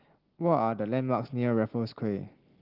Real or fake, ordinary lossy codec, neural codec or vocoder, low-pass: real; Opus, 16 kbps; none; 5.4 kHz